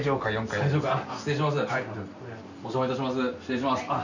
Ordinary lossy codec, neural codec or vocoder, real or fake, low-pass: none; none; real; 7.2 kHz